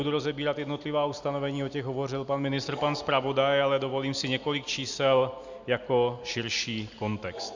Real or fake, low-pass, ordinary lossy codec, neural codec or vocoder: real; 7.2 kHz; Opus, 64 kbps; none